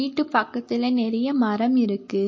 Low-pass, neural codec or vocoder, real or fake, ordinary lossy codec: 7.2 kHz; none; real; MP3, 32 kbps